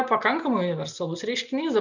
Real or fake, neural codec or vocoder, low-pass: real; none; 7.2 kHz